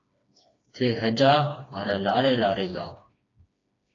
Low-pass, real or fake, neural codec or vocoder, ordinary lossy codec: 7.2 kHz; fake; codec, 16 kHz, 2 kbps, FreqCodec, smaller model; AAC, 32 kbps